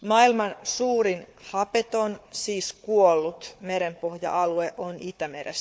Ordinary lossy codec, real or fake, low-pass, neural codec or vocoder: none; fake; none; codec, 16 kHz, 4 kbps, FunCodec, trained on Chinese and English, 50 frames a second